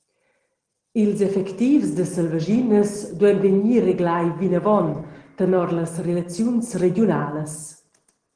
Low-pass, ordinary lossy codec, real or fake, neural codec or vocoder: 9.9 kHz; Opus, 16 kbps; real; none